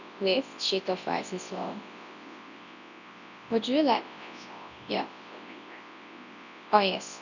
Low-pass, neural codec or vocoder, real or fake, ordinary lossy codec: 7.2 kHz; codec, 24 kHz, 0.9 kbps, WavTokenizer, large speech release; fake; none